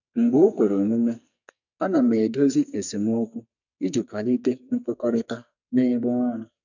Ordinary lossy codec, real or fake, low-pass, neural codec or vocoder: none; fake; 7.2 kHz; codec, 44.1 kHz, 2.6 kbps, SNAC